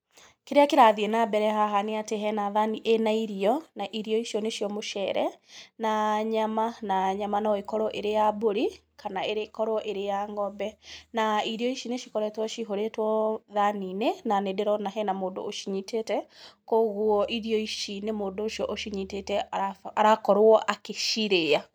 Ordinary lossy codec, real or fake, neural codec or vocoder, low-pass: none; real; none; none